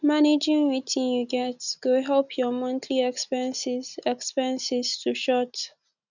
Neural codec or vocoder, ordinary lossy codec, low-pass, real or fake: none; none; 7.2 kHz; real